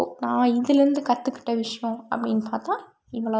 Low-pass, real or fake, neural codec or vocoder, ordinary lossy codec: none; real; none; none